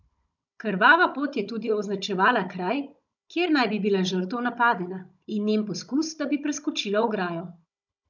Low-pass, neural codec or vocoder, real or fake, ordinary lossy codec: 7.2 kHz; codec, 16 kHz, 16 kbps, FunCodec, trained on Chinese and English, 50 frames a second; fake; none